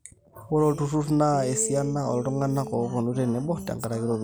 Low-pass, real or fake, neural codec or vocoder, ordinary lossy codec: none; real; none; none